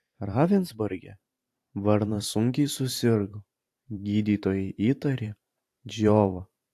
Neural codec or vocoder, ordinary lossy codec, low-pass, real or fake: vocoder, 44.1 kHz, 128 mel bands every 512 samples, BigVGAN v2; AAC, 64 kbps; 14.4 kHz; fake